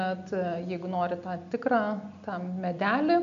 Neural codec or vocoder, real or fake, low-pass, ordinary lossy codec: none; real; 7.2 kHz; MP3, 64 kbps